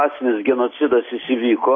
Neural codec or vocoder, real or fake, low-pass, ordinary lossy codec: none; real; 7.2 kHz; AAC, 32 kbps